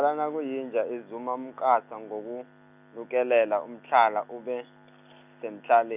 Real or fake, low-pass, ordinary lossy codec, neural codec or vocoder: real; 3.6 kHz; none; none